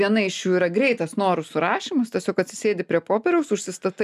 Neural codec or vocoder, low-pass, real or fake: none; 14.4 kHz; real